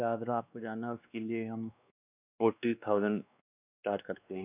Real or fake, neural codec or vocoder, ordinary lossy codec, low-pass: fake; codec, 16 kHz, 2 kbps, X-Codec, WavLM features, trained on Multilingual LibriSpeech; none; 3.6 kHz